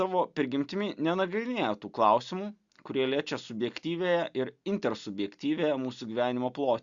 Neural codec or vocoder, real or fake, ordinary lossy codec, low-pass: none; real; Opus, 64 kbps; 7.2 kHz